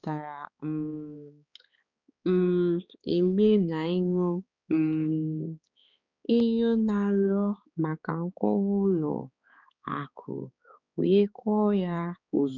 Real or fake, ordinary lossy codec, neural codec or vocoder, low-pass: fake; none; codec, 16 kHz, 4 kbps, X-Codec, HuBERT features, trained on balanced general audio; 7.2 kHz